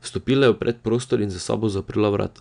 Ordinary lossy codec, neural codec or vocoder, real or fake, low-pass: none; none; real; 9.9 kHz